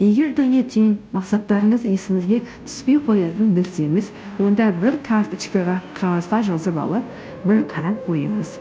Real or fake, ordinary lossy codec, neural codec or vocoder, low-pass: fake; none; codec, 16 kHz, 0.5 kbps, FunCodec, trained on Chinese and English, 25 frames a second; none